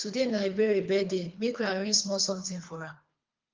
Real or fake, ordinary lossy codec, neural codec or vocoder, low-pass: fake; Opus, 32 kbps; codec, 24 kHz, 3 kbps, HILCodec; 7.2 kHz